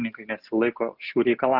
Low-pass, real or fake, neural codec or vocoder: 5.4 kHz; real; none